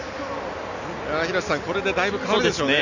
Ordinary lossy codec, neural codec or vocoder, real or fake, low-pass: none; none; real; 7.2 kHz